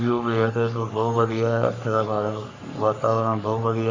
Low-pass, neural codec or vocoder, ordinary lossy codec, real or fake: 7.2 kHz; codec, 44.1 kHz, 3.4 kbps, Pupu-Codec; MP3, 64 kbps; fake